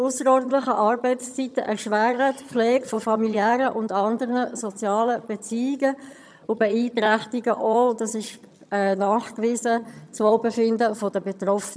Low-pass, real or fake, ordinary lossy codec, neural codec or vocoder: none; fake; none; vocoder, 22.05 kHz, 80 mel bands, HiFi-GAN